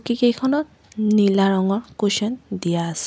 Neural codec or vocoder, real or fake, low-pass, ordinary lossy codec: none; real; none; none